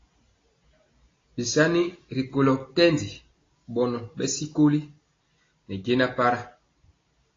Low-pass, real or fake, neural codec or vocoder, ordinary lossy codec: 7.2 kHz; real; none; AAC, 32 kbps